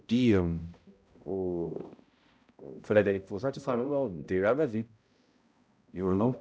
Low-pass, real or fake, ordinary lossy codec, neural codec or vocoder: none; fake; none; codec, 16 kHz, 0.5 kbps, X-Codec, HuBERT features, trained on balanced general audio